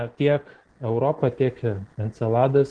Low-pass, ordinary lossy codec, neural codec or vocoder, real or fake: 9.9 kHz; Opus, 16 kbps; none; real